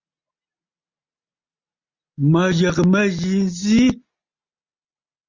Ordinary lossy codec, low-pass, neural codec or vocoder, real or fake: Opus, 64 kbps; 7.2 kHz; none; real